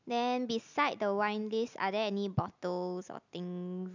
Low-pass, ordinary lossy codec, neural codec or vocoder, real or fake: 7.2 kHz; none; none; real